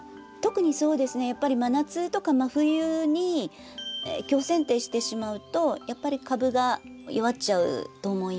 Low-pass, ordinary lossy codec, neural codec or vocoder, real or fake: none; none; none; real